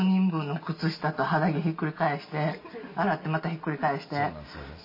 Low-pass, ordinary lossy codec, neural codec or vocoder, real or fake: 5.4 kHz; MP3, 24 kbps; none; real